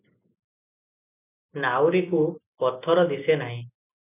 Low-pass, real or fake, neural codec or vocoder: 3.6 kHz; real; none